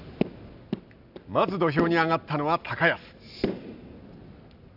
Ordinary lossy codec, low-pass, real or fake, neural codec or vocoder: none; 5.4 kHz; real; none